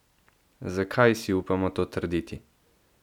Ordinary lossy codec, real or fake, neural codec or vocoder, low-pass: none; real; none; 19.8 kHz